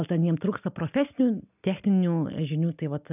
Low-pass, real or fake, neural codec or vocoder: 3.6 kHz; real; none